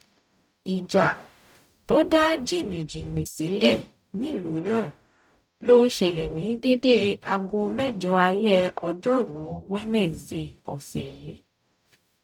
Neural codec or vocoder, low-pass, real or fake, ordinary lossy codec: codec, 44.1 kHz, 0.9 kbps, DAC; 19.8 kHz; fake; none